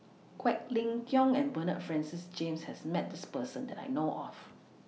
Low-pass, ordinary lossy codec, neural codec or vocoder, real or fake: none; none; none; real